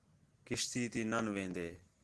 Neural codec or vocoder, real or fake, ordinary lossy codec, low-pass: none; real; Opus, 16 kbps; 10.8 kHz